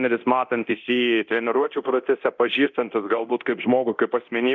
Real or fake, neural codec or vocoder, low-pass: fake; codec, 24 kHz, 0.9 kbps, DualCodec; 7.2 kHz